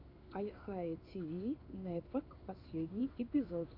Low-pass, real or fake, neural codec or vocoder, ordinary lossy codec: 5.4 kHz; fake; codec, 16 kHz in and 24 kHz out, 1 kbps, XY-Tokenizer; AAC, 48 kbps